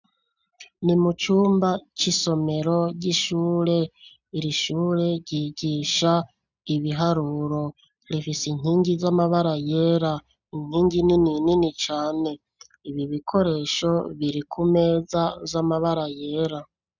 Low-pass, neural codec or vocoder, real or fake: 7.2 kHz; none; real